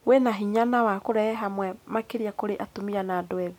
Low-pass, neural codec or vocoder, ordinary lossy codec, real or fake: 19.8 kHz; none; none; real